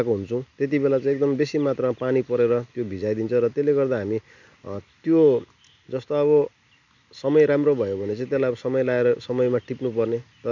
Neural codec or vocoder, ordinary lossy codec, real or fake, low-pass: none; none; real; 7.2 kHz